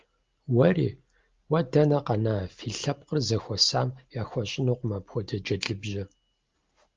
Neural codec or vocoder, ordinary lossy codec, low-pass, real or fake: none; Opus, 32 kbps; 7.2 kHz; real